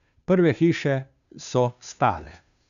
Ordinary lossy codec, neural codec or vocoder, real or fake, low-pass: none; codec, 16 kHz, 2 kbps, FunCodec, trained on Chinese and English, 25 frames a second; fake; 7.2 kHz